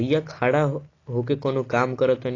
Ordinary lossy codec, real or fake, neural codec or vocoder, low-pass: AAC, 32 kbps; real; none; 7.2 kHz